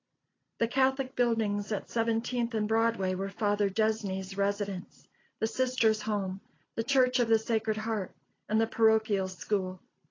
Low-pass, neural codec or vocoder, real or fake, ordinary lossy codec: 7.2 kHz; none; real; AAC, 32 kbps